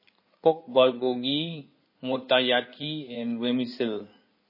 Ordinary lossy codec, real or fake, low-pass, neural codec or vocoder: MP3, 24 kbps; fake; 5.4 kHz; codec, 16 kHz in and 24 kHz out, 2.2 kbps, FireRedTTS-2 codec